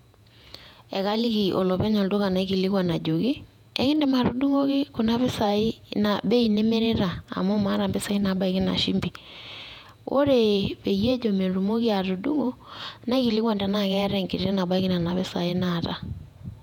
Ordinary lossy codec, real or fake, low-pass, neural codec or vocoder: none; fake; 19.8 kHz; vocoder, 48 kHz, 128 mel bands, Vocos